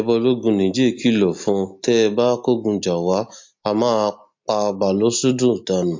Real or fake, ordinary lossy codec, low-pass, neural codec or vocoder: real; MP3, 48 kbps; 7.2 kHz; none